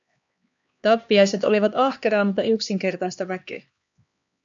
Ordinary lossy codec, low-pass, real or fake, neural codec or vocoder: MP3, 64 kbps; 7.2 kHz; fake; codec, 16 kHz, 2 kbps, X-Codec, HuBERT features, trained on LibriSpeech